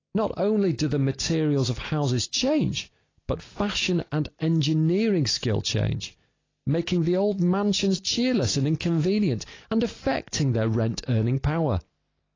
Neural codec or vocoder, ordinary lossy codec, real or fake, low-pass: none; AAC, 32 kbps; real; 7.2 kHz